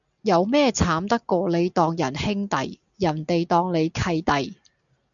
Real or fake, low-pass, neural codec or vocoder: real; 7.2 kHz; none